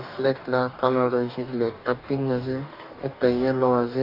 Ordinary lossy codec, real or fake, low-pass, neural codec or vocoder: none; fake; 5.4 kHz; codec, 44.1 kHz, 2.6 kbps, DAC